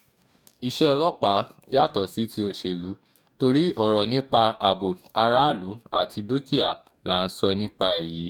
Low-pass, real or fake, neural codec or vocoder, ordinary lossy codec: 19.8 kHz; fake; codec, 44.1 kHz, 2.6 kbps, DAC; none